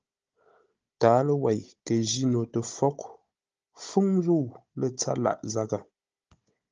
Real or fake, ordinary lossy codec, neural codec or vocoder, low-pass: fake; Opus, 24 kbps; codec, 16 kHz, 16 kbps, FunCodec, trained on Chinese and English, 50 frames a second; 7.2 kHz